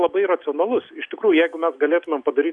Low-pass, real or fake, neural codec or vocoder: 10.8 kHz; real; none